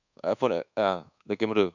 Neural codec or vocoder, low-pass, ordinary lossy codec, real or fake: codec, 24 kHz, 1.2 kbps, DualCodec; 7.2 kHz; none; fake